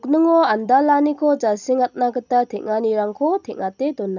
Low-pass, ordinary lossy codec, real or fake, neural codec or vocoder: 7.2 kHz; none; real; none